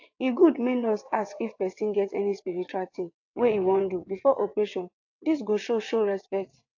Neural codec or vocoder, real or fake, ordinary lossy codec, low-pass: vocoder, 22.05 kHz, 80 mel bands, WaveNeXt; fake; AAC, 48 kbps; 7.2 kHz